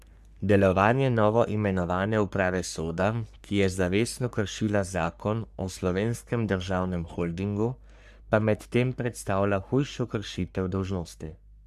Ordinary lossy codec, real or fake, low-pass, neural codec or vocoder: none; fake; 14.4 kHz; codec, 44.1 kHz, 3.4 kbps, Pupu-Codec